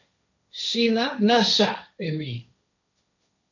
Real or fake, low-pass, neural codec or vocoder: fake; 7.2 kHz; codec, 16 kHz, 1.1 kbps, Voila-Tokenizer